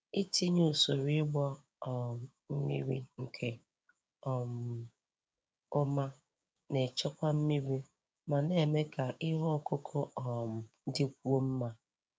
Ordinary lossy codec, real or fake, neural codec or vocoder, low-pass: none; fake; codec, 16 kHz, 6 kbps, DAC; none